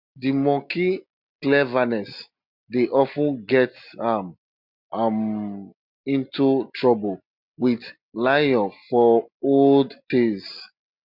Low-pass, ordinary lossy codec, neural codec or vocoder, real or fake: 5.4 kHz; MP3, 48 kbps; none; real